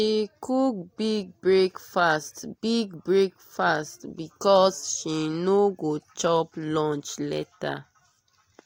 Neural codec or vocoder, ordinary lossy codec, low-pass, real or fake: none; AAC, 48 kbps; 19.8 kHz; real